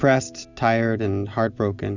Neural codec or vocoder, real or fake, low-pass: none; real; 7.2 kHz